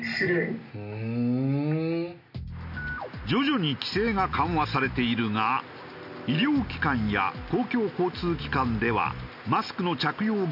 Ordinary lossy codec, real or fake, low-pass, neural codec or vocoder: none; real; 5.4 kHz; none